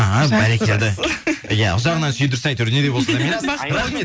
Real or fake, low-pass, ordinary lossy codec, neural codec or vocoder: real; none; none; none